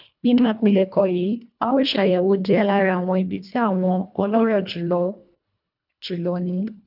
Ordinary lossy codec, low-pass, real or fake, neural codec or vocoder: none; 5.4 kHz; fake; codec, 24 kHz, 1.5 kbps, HILCodec